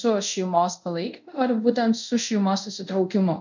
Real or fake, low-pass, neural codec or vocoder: fake; 7.2 kHz; codec, 24 kHz, 0.5 kbps, DualCodec